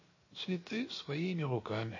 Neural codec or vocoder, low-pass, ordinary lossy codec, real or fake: codec, 16 kHz, 0.7 kbps, FocalCodec; 7.2 kHz; MP3, 32 kbps; fake